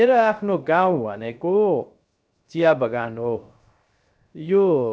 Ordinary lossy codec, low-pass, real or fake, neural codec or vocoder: none; none; fake; codec, 16 kHz, 0.3 kbps, FocalCodec